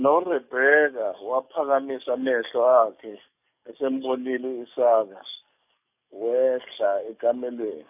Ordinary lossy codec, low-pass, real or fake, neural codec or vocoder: none; 3.6 kHz; fake; codec, 44.1 kHz, 7.8 kbps, DAC